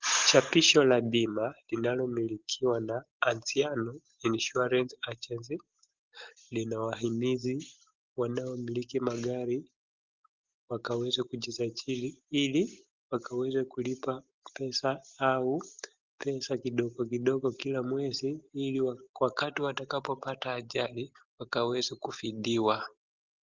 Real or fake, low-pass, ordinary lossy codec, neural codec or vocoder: real; 7.2 kHz; Opus, 16 kbps; none